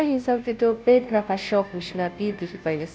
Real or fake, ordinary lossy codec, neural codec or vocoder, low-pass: fake; none; codec, 16 kHz, 0.5 kbps, FunCodec, trained on Chinese and English, 25 frames a second; none